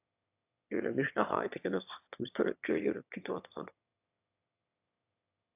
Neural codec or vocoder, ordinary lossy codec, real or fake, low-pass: autoencoder, 22.05 kHz, a latent of 192 numbers a frame, VITS, trained on one speaker; AAC, 32 kbps; fake; 3.6 kHz